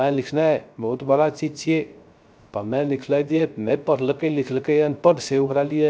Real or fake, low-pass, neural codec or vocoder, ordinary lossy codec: fake; none; codec, 16 kHz, 0.3 kbps, FocalCodec; none